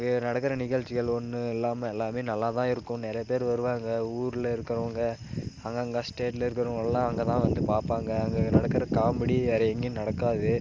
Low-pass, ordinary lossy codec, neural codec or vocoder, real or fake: 7.2 kHz; Opus, 32 kbps; none; real